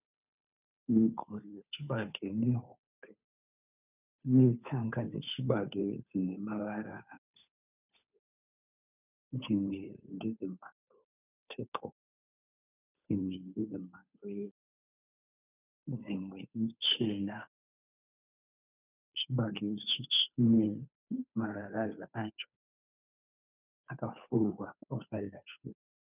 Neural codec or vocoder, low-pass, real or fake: codec, 16 kHz, 2 kbps, FunCodec, trained on Chinese and English, 25 frames a second; 3.6 kHz; fake